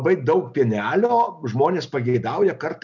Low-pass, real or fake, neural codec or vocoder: 7.2 kHz; real; none